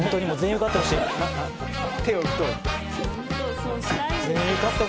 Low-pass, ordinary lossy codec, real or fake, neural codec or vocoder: none; none; real; none